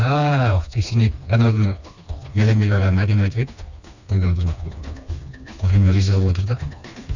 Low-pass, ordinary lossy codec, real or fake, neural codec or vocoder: 7.2 kHz; none; fake; codec, 16 kHz, 2 kbps, FreqCodec, smaller model